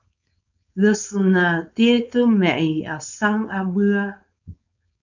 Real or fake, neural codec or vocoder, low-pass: fake; codec, 16 kHz, 4.8 kbps, FACodec; 7.2 kHz